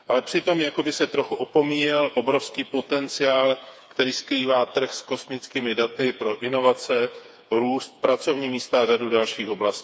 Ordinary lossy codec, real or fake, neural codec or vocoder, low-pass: none; fake; codec, 16 kHz, 4 kbps, FreqCodec, smaller model; none